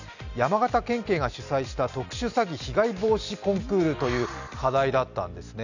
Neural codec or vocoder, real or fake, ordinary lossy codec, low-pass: none; real; none; 7.2 kHz